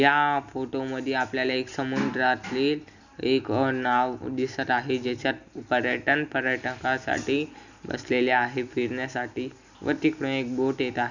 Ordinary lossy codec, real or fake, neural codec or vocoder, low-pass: none; real; none; 7.2 kHz